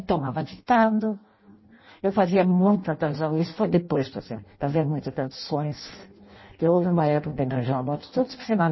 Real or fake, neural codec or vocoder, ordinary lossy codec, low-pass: fake; codec, 16 kHz in and 24 kHz out, 0.6 kbps, FireRedTTS-2 codec; MP3, 24 kbps; 7.2 kHz